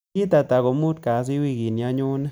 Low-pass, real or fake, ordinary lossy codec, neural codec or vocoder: none; real; none; none